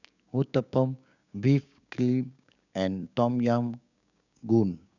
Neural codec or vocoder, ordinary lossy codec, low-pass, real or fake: codec, 16 kHz, 6 kbps, DAC; none; 7.2 kHz; fake